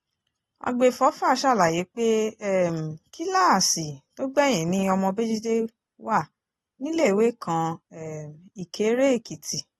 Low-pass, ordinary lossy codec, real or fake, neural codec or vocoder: 14.4 kHz; AAC, 32 kbps; real; none